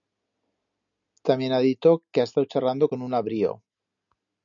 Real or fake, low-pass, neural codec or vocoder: real; 7.2 kHz; none